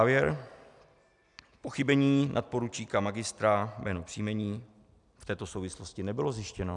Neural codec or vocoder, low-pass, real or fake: none; 10.8 kHz; real